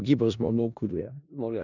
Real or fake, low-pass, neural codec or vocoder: fake; 7.2 kHz; codec, 16 kHz in and 24 kHz out, 0.4 kbps, LongCat-Audio-Codec, four codebook decoder